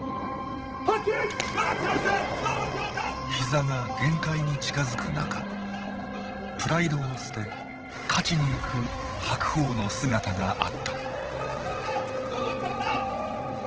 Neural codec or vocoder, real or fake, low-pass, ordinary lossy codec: codec, 16 kHz, 16 kbps, FreqCodec, larger model; fake; 7.2 kHz; Opus, 16 kbps